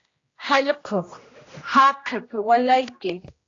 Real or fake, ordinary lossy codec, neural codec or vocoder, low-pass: fake; AAC, 32 kbps; codec, 16 kHz, 1 kbps, X-Codec, HuBERT features, trained on general audio; 7.2 kHz